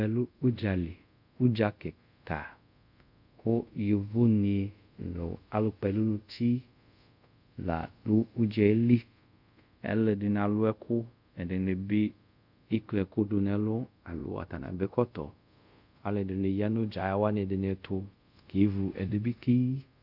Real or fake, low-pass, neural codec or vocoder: fake; 5.4 kHz; codec, 24 kHz, 0.5 kbps, DualCodec